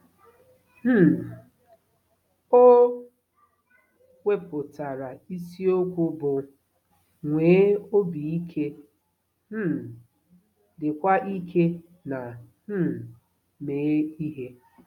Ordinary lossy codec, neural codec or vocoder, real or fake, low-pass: none; none; real; 19.8 kHz